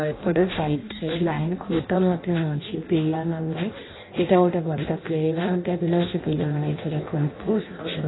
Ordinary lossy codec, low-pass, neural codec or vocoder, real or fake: AAC, 16 kbps; 7.2 kHz; codec, 16 kHz in and 24 kHz out, 0.6 kbps, FireRedTTS-2 codec; fake